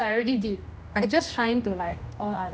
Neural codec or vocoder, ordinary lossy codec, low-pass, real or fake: codec, 16 kHz, 1 kbps, X-Codec, HuBERT features, trained on general audio; none; none; fake